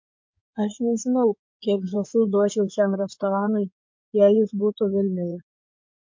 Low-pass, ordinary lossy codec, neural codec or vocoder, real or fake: 7.2 kHz; MP3, 48 kbps; codec, 16 kHz in and 24 kHz out, 2.2 kbps, FireRedTTS-2 codec; fake